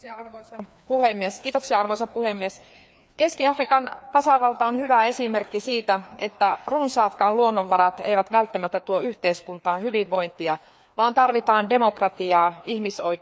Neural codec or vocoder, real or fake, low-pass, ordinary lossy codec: codec, 16 kHz, 2 kbps, FreqCodec, larger model; fake; none; none